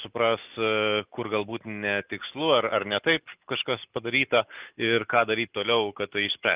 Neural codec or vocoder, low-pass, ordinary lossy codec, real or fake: none; 3.6 kHz; Opus, 24 kbps; real